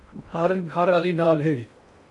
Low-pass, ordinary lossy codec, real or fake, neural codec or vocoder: 10.8 kHz; AAC, 64 kbps; fake; codec, 16 kHz in and 24 kHz out, 0.6 kbps, FocalCodec, streaming, 2048 codes